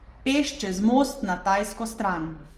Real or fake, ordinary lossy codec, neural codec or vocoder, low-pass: real; Opus, 24 kbps; none; 14.4 kHz